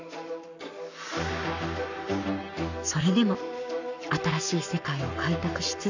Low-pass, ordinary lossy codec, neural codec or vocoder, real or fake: 7.2 kHz; none; codec, 44.1 kHz, 7.8 kbps, Pupu-Codec; fake